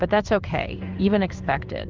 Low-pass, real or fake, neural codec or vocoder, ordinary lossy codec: 7.2 kHz; fake; codec, 16 kHz in and 24 kHz out, 1 kbps, XY-Tokenizer; Opus, 24 kbps